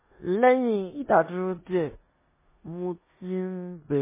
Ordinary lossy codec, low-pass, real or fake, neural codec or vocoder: MP3, 16 kbps; 3.6 kHz; fake; codec, 16 kHz in and 24 kHz out, 0.9 kbps, LongCat-Audio-Codec, four codebook decoder